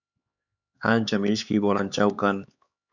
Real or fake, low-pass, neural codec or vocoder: fake; 7.2 kHz; codec, 16 kHz, 4 kbps, X-Codec, HuBERT features, trained on LibriSpeech